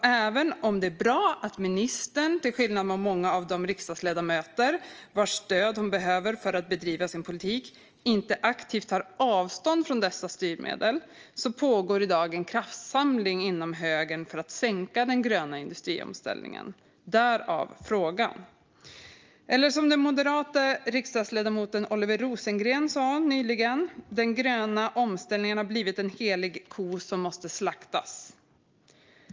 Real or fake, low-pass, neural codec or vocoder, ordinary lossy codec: real; 7.2 kHz; none; Opus, 24 kbps